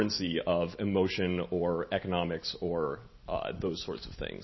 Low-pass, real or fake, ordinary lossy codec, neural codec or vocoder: 7.2 kHz; real; MP3, 24 kbps; none